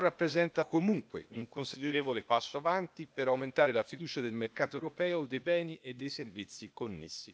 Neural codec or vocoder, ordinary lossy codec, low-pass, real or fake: codec, 16 kHz, 0.8 kbps, ZipCodec; none; none; fake